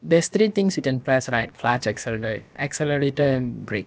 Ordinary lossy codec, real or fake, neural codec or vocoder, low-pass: none; fake; codec, 16 kHz, about 1 kbps, DyCAST, with the encoder's durations; none